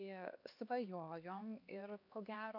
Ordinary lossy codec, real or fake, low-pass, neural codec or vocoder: AAC, 48 kbps; fake; 5.4 kHz; codec, 16 kHz, 2 kbps, X-Codec, HuBERT features, trained on LibriSpeech